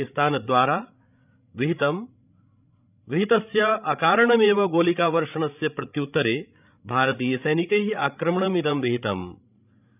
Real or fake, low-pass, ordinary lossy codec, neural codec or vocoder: fake; 3.6 kHz; none; codec, 16 kHz, 16 kbps, FreqCodec, larger model